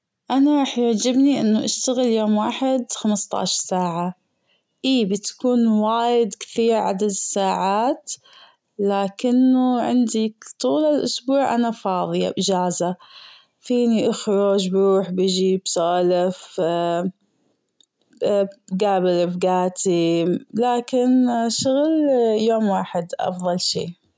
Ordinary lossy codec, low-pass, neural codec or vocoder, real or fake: none; none; none; real